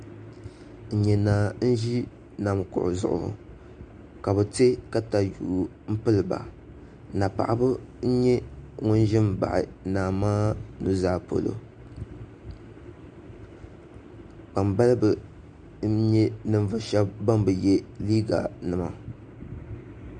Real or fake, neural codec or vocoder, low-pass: real; none; 9.9 kHz